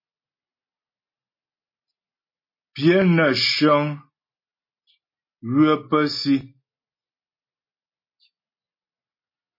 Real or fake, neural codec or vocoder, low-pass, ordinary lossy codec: real; none; 5.4 kHz; MP3, 24 kbps